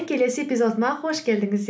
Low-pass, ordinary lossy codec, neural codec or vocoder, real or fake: none; none; none; real